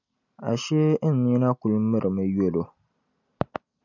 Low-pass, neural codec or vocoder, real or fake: 7.2 kHz; none; real